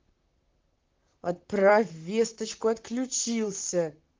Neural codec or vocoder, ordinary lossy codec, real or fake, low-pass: vocoder, 44.1 kHz, 128 mel bands, Pupu-Vocoder; Opus, 32 kbps; fake; 7.2 kHz